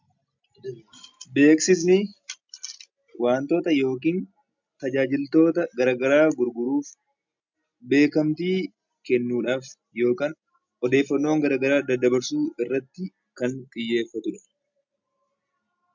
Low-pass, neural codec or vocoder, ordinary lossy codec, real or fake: 7.2 kHz; none; MP3, 64 kbps; real